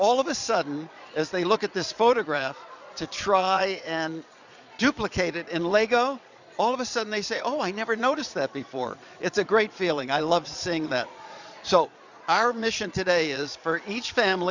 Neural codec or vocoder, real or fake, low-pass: vocoder, 22.05 kHz, 80 mel bands, Vocos; fake; 7.2 kHz